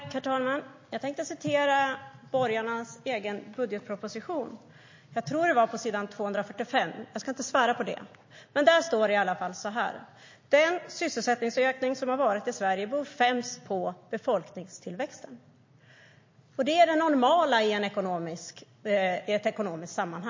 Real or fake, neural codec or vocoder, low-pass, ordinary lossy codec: real; none; 7.2 kHz; MP3, 32 kbps